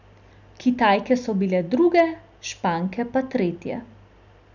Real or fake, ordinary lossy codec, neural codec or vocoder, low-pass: real; none; none; 7.2 kHz